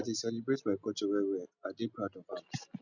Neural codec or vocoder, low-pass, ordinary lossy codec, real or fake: none; 7.2 kHz; none; real